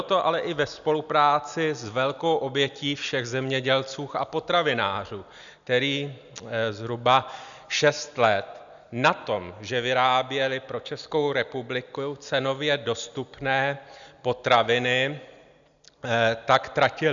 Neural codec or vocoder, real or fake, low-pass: none; real; 7.2 kHz